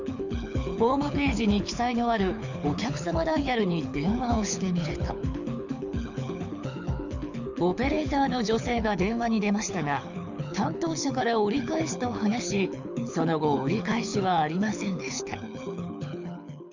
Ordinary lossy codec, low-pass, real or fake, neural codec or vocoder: none; 7.2 kHz; fake; codec, 24 kHz, 6 kbps, HILCodec